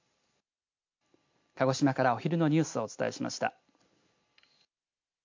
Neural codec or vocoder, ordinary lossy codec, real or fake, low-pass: none; MP3, 48 kbps; real; 7.2 kHz